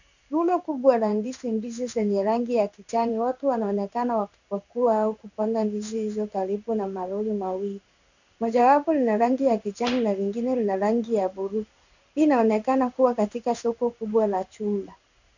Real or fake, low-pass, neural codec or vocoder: fake; 7.2 kHz; codec, 16 kHz in and 24 kHz out, 1 kbps, XY-Tokenizer